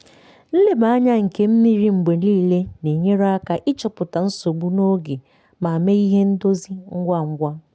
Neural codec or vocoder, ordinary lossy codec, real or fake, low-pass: none; none; real; none